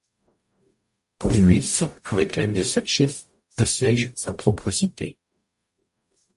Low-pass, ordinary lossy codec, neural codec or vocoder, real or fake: 14.4 kHz; MP3, 48 kbps; codec, 44.1 kHz, 0.9 kbps, DAC; fake